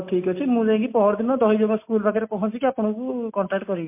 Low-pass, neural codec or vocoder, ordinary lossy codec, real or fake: 3.6 kHz; none; MP3, 24 kbps; real